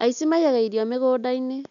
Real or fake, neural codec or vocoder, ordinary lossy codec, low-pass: real; none; none; 7.2 kHz